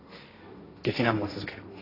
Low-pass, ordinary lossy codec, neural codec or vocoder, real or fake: 5.4 kHz; AAC, 24 kbps; codec, 16 kHz, 1.1 kbps, Voila-Tokenizer; fake